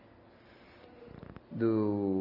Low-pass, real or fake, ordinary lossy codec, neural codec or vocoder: 5.4 kHz; real; none; none